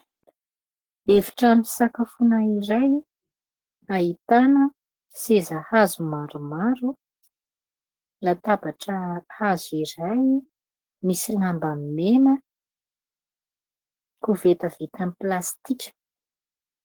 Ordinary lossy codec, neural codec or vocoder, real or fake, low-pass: Opus, 16 kbps; codec, 44.1 kHz, 7.8 kbps, Pupu-Codec; fake; 19.8 kHz